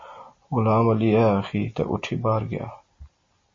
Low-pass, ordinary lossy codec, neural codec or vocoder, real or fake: 7.2 kHz; MP3, 32 kbps; none; real